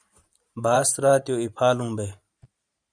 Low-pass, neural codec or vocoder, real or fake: 9.9 kHz; vocoder, 44.1 kHz, 128 mel bands every 512 samples, BigVGAN v2; fake